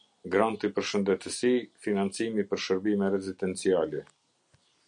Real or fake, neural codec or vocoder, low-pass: real; none; 9.9 kHz